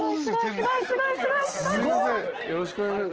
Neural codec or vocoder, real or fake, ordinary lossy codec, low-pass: none; real; Opus, 16 kbps; 7.2 kHz